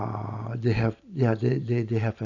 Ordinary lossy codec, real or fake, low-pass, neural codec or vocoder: none; fake; 7.2 kHz; vocoder, 44.1 kHz, 128 mel bands every 512 samples, BigVGAN v2